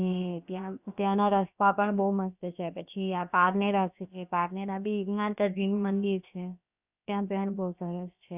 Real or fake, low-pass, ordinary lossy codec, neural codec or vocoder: fake; 3.6 kHz; none; codec, 16 kHz, about 1 kbps, DyCAST, with the encoder's durations